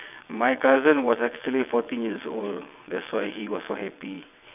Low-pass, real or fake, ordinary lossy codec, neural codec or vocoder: 3.6 kHz; fake; none; vocoder, 22.05 kHz, 80 mel bands, WaveNeXt